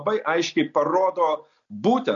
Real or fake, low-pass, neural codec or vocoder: real; 7.2 kHz; none